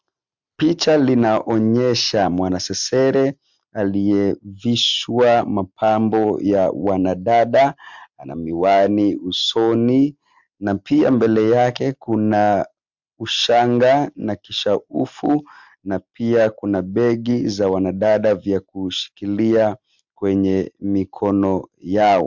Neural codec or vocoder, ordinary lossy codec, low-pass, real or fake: none; MP3, 64 kbps; 7.2 kHz; real